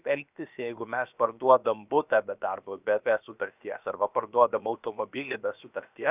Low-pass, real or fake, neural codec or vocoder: 3.6 kHz; fake; codec, 16 kHz, about 1 kbps, DyCAST, with the encoder's durations